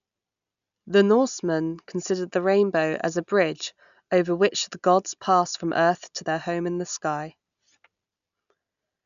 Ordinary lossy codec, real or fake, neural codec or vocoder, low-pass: none; real; none; 7.2 kHz